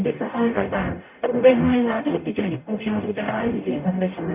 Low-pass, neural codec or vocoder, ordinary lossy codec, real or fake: 3.6 kHz; codec, 44.1 kHz, 0.9 kbps, DAC; none; fake